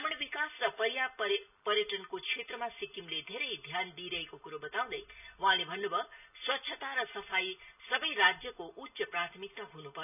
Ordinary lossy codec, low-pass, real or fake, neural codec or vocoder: none; 3.6 kHz; real; none